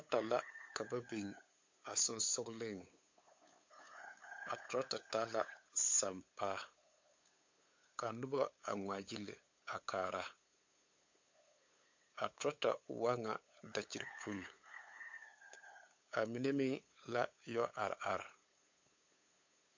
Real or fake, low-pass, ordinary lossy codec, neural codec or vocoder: fake; 7.2 kHz; MP3, 48 kbps; codec, 16 kHz, 8 kbps, FunCodec, trained on LibriTTS, 25 frames a second